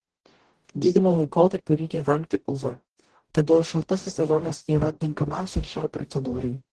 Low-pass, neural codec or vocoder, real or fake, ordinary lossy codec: 10.8 kHz; codec, 44.1 kHz, 0.9 kbps, DAC; fake; Opus, 16 kbps